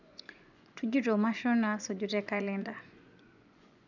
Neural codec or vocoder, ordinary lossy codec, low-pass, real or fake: vocoder, 24 kHz, 100 mel bands, Vocos; none; 7.2 kHz; fake